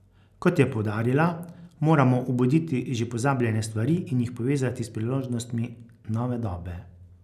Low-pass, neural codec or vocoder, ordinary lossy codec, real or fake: 14.4 kHz; none; none; real